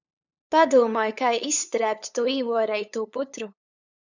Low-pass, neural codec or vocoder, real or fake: 7.2 kHz; codec, 16 kHz, 8 kbps, FunCodec, trained on LibriTTS, 25 frames a second; fake